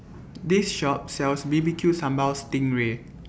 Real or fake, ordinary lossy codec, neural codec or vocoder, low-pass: real; none; none; none